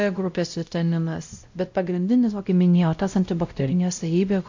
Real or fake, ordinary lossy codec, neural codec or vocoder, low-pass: fake; AAC, 48 kbps; codec, 16 kHz, 0.5 kbps, X-Codec, WavLM features, trained on Multilingual LibriSpeech; 7.2 kHz